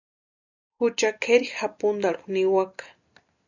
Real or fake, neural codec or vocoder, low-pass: real; none; 7.2 kHz